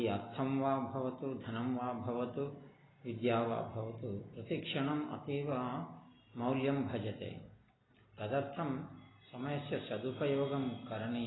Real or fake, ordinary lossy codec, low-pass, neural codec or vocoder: real; AAC, 16 kbps; 7.2 kHz; none